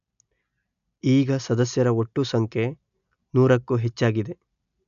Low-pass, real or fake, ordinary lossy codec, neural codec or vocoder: 7.2 kHz; real; none; none